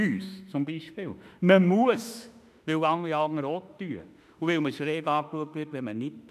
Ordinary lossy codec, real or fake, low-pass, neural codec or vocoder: none; fake; 14.4 kHz; autoencoder, 48 kHz, 32 numbers a frame, DAC-VAE, trained on Japanese speech